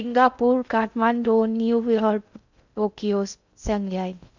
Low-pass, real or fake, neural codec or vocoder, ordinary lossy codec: 7.2 kHz; fake; codec, 16 kHz in and 24 kHz out, 0.6 kbps, FocalCodec, streaming, 2048 codes; none